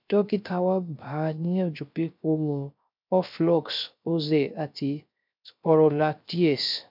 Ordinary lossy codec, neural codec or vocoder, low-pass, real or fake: MP3, 48 kbps; codec, 16 kHz, 0.3 kbps, FocalCodec; 5.4 kHz; fake